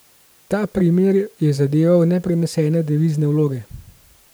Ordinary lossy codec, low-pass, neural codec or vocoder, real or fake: none; none; vocoder, 44.1 kHz, 128 mel bands every 512 samples, BigVGAN v2; fake